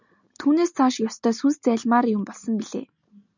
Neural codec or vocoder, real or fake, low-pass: none; real; 7.2 kHz